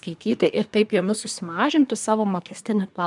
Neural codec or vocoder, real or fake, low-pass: codec, 24 kHz, 1 kbps, SNAC; fake; 10.8 kHz